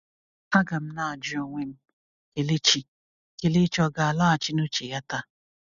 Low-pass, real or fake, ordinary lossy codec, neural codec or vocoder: 7.2 kHz; real; none; none